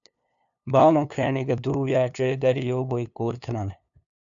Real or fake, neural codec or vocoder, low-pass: fake; codec, 16 kHz, 2 kbps, FunCodec, trained on LibriTTS, 25 frames a second; 7.2 kHz